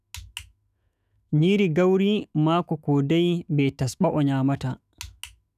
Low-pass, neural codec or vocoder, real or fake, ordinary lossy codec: 14.4 kHz; autoencoder, 48 kHz, 128 numbers a frame, DAC-VAE, trained on Japanese speech; fake; none